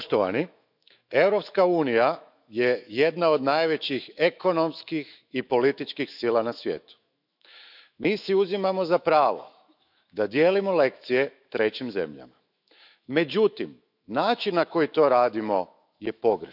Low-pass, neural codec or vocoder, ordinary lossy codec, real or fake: 5.4 kHz; autoencoder, 48 kHz, 128 numbers a frame, DAC-VAE, trained on Japanese speech; none; fake